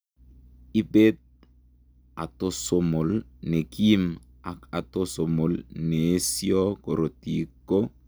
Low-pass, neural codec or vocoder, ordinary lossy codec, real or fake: none; none; none; real